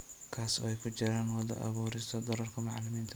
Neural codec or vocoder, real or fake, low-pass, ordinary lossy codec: vocoder, 44.1 kHz, 128 mel bands every 512 samples, BigVGAN v2; fake; none; none